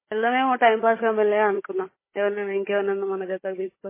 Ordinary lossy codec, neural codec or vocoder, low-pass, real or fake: MP3, 16 kbps; codec, 16 kHz, 16 kbps, FunCodec, trained on Chinese and English, 50 frames a second; 3.6 kHz; fake